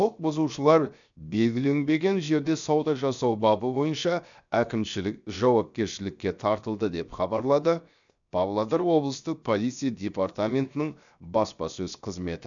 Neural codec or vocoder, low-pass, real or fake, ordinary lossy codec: codec, 16 kHz, 0.7 kbps, FocalCodec; 7.2 kHz; fake; none